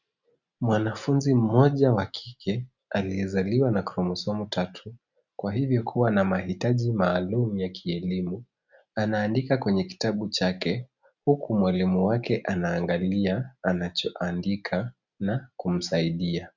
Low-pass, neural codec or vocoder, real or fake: 7.2 kHz; none; real